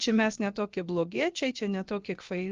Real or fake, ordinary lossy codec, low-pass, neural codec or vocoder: fake; Opus, 32 kbps; 7.2 kHz; codec, 16 kHz, about 1 kbps, DyCAST, with the encoder's durations